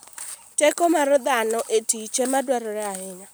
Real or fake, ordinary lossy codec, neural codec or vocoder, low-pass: real; none; none; none